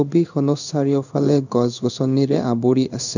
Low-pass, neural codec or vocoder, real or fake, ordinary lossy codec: 7.2 kHz; codec, 16 kHz in and 24 kHz out, 1 kbps, XY-Tokenizer; fake; none